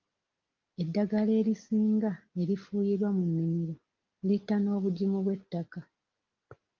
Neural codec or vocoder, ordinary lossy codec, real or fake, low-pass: none; Opus, 24 kbps; real; 7.2 kHz